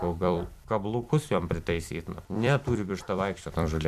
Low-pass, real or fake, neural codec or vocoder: 14.4 kHz; fake; vocoder, 44.1 kHz, 128 mel bands every 256 samples, BigVGAN v2